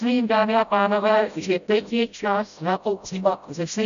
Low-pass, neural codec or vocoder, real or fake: 7.2 kHz; codec, 16 kHz, 0.5 kbps, FreqCodec, smaller model; fake